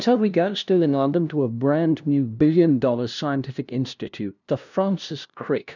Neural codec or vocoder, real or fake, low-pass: codec, 16 kHz, 0.5 kbps, FunCodec, trained on LibriTTS, 25 frames a second; fake; 7.2 kHz